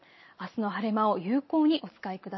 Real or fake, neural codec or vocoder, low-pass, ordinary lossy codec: real; none; 7.2 kHz; MP3, 24 kbps